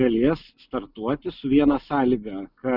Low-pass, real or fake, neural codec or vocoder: 5.4 kHz; real; none